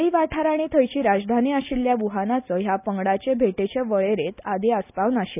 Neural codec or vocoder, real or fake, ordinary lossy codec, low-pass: none; real; none; 3.6 kHz